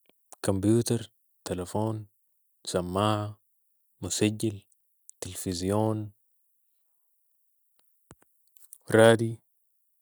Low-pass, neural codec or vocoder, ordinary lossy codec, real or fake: none; none; none; real